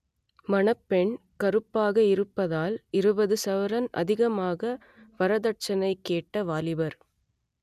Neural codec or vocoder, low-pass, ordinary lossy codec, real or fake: none; 14.4 kHz; none; real